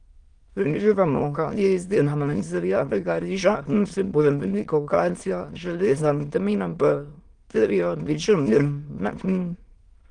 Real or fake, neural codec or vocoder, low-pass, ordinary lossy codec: fake; autoencoder, 22.05 kHz, a latent of 192 numbers a frame, VITS, trained on many speakers; 9.9 kHz; Opus, 24 kbps